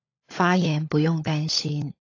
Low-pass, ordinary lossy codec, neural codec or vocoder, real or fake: 7.2 kHz; AAC, 32 kbps; codec, 16 kHz, 16 kbps, FunCodec, trained on LibriTTS, 50 frames a second; fake